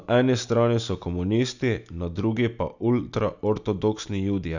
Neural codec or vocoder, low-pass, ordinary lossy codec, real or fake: none; 7.2 kHz; none; real